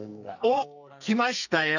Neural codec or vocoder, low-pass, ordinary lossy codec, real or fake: codec, 44.1 kHz, 2.6 kbps, SNAC; 7.2 kHz; none; fake